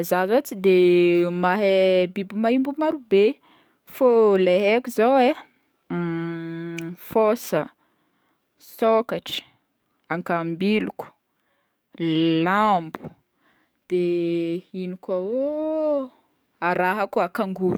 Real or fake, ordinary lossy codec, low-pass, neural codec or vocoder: fake; none; none; codec, 44.1 kHz, 7.8 kbps, DAC